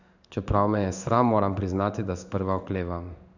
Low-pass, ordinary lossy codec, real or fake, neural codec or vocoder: 7.2 kHz; none; fake; codec, 16 kHz in and 24 kHz out, 1 kbps, XY-Tokenizer